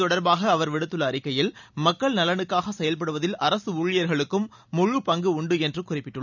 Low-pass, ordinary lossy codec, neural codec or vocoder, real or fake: 7.2 kHz; none; none; real